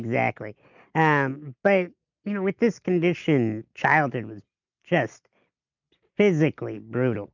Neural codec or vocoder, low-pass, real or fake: none; 7.2 kHz; real